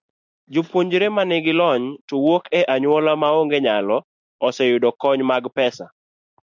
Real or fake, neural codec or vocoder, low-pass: real; none; 7.2 kHz